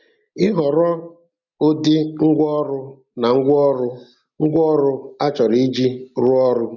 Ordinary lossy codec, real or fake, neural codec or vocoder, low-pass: none; real; none; 7.2 kHz